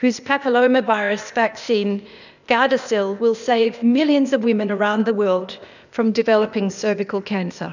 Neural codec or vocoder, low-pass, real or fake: codec, 16 kHz, 0.8 kbps, ZipCodec; 7.2 kHz; fake